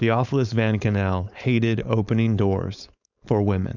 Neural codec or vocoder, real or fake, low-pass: codec, 16 kHz, 4.8 kbps, FACodec; fake; 7.2 kHz